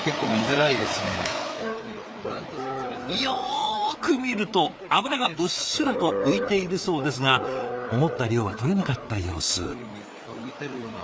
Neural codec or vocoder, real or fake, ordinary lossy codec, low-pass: codec, 16 kHz, 4 kbps, FreqCodec, larger model; fake; none; none